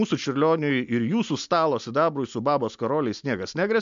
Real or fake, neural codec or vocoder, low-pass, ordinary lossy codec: real; none; 7.2 kHz; MP3, 64 kbps